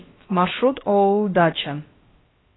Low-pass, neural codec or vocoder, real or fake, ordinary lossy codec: 7.2 kHz; codec, 16 kHz, about 1 kbps, DyCAST, with the encoder's durations; fake; AAC, 16 kbps